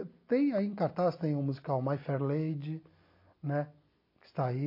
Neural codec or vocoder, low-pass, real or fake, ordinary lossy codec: none; 5.4 kHz; real; MP3, 32 kbps